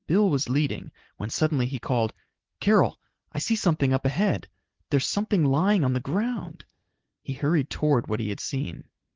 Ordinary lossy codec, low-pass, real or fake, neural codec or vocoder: Opus, 16 kbps; 7.2 kHz; real; none